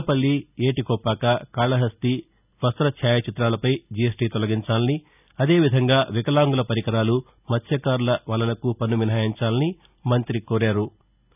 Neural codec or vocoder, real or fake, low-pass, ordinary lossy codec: none; real; 3.6 kHz; none